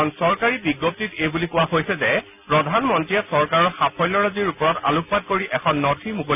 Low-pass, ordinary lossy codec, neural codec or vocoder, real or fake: 3.6 kHz; none; none; real